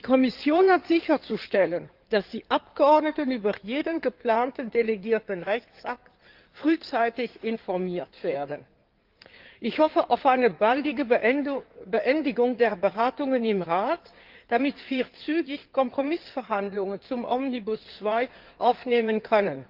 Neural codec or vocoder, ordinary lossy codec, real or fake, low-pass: codec, 16 kHz in and 24 kHz out, 2.2 kbps, FireRedTTS-2 codec; Opus, 32 kbps; fake; 5.4 kHz